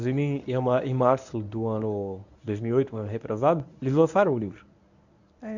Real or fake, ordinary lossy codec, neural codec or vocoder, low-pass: fake; none; codec, 24 kHz, 0.9 kbps, WavTokenizer, medium speech release version 1; 7.2 kHz